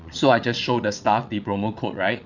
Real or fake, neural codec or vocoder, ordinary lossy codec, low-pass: fake; vocoder, 22.05 kHz, 80 mel bands, WaveNeXt; none; 7.2 kHz